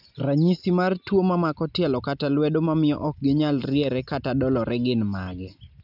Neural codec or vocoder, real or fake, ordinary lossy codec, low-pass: none; real; none; 5.4 kHz